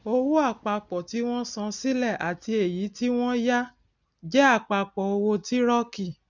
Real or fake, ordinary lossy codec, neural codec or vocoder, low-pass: real; none; none; 7.2 kHz